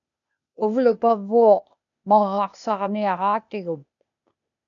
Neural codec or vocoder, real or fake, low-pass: codec, 16 kHz, 0.8 kbps, ZipCodec; fake; 7.2 kHz